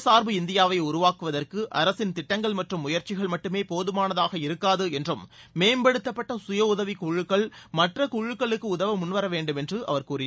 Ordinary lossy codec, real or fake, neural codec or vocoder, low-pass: none; real; none; none